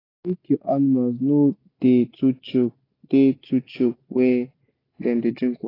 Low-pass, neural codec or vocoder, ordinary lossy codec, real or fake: 5.4 kHz; none; AAC, 24 kbps; real